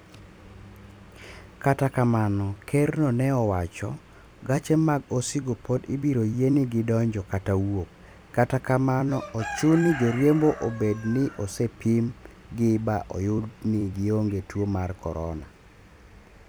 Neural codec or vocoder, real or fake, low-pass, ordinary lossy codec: vocoder, 44.1 kHz, 128 mel bands every 256 samples, BigVGAN v2; fake; none; none